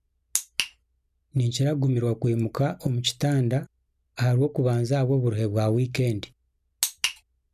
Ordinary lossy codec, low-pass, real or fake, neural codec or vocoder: none; 14.4 kHz; real; none